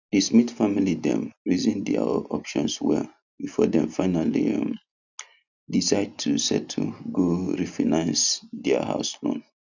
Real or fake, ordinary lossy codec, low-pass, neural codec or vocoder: real; none; 7.2 kHz; none